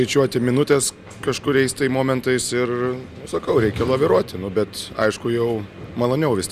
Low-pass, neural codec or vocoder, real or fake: 14.4 kHz; none; real